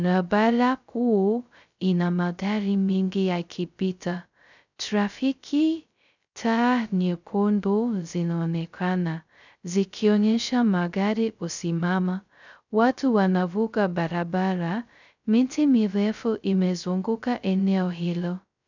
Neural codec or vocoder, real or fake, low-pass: codec, 16 kHz, 0.2 kbps, FocalCodec; fake; 7.2 kHz